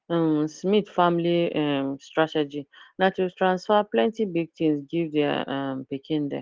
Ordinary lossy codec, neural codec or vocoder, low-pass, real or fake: Opus, 16 kbps; none; 7.2 kHz; real